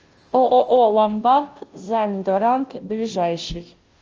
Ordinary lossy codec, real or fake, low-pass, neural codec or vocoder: Opus, 24 kbps; fake; 7.2 kHz; codec, 16 kHz, 0.5 kbps, FunCodec, trained on Chinese and English, 25 frames a second